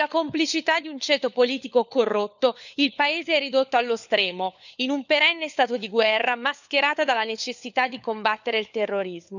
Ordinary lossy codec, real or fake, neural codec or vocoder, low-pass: none; fake; codec, 16 kHz, 4 kbps, FunCodec, trained on LibriTTS, 50 frames a second; 7.2 kHz